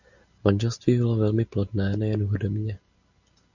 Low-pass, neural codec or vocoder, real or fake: 7.2 kHz; none; real